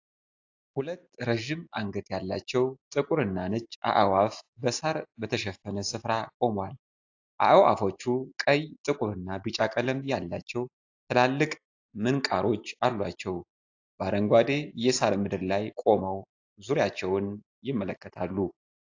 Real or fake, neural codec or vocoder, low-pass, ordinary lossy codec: real; none; 7.2 kHz; AAC, 48 kbps